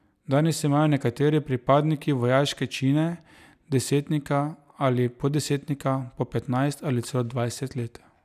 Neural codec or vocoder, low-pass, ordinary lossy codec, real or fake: none; 14.4 kHz; none; real